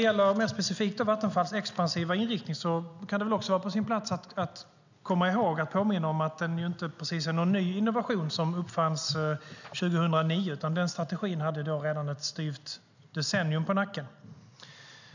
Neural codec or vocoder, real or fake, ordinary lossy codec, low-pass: none; real; none; 7.2 kHz